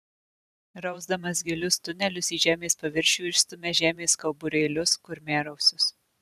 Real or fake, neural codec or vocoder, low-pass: fake; vocoder, 44.1 kHz, 128 mel bands every 512 samples, BigVGAN v2; 14.4 kHz